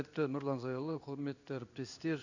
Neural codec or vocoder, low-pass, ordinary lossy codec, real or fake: codec, 16 kHz in and 24 kHz out, 1 kbps, XY-Tokenizer; 7.2 kHz; none; fake